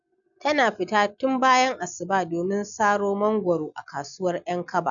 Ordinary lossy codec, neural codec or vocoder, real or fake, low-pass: none; none; real; 7.2 kHz